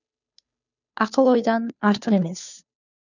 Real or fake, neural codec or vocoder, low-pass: fake; codec, 16 kHz, 2 kbps, FunCodec, trained on Chinese and English, 25 frames a second; 7.2 kHz